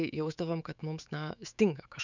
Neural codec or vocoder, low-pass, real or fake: autoencoder, 48 kHz, 128 numbers a frame, DAC-VAE, trained on Japanese speech; 7.2 kHz; fake